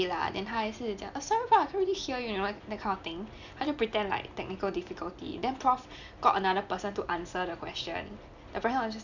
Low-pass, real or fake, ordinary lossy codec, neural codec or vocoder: 7.2 kHz; real; none; none